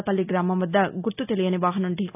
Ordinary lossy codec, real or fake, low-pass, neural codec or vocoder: none; real; 3.6 kHz; none